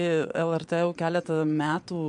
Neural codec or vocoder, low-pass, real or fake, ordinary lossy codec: vocoder, 22.05 kHz, 80 mel bands, Vocos; 9.9 kHz; fake; MP3, 64 kbps